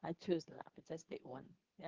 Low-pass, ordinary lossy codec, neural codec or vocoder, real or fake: 7.2 kHz; Opus, 16 kbps; codec, 24 kHz, 0.9 kbps, WavTokenizer, medium speech release version 2; fake